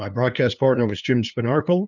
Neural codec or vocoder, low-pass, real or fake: codec, 16 kHz, 2 kbps, FunCodec, trained on LibriTTS, 25 frames a second; 7.2 kHz; fake